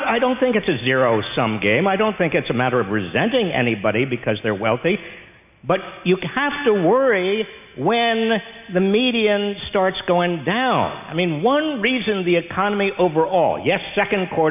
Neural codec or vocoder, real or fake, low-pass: none; real; 3.6 kHz